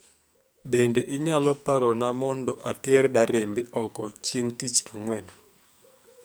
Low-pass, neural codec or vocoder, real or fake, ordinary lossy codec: none; codec, 44.1 kHz, 2.6 kbps, SNAC; fake; none